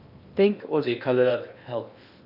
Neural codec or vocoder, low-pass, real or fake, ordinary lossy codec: codec, 16 kHz in and 24 kHz out, 0.8 kbps, FocalCodec, streaming, 65536 codes; 5.4 kHz; fake; none